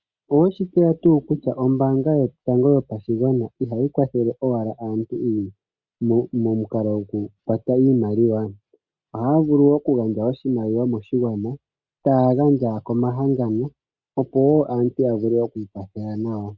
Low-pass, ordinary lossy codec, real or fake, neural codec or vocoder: 7.2 kHz; Opus, 64 kbps; real; none